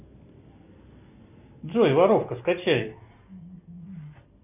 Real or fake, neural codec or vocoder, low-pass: real; none; 3.6 kHz